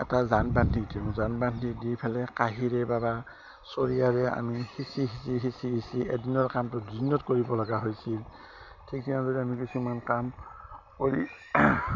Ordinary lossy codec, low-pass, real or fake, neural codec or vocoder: none; 7.2 kHz; real; none